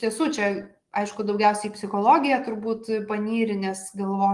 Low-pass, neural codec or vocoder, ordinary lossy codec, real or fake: 10.8 kHz; none; Opus, 24 kbps; real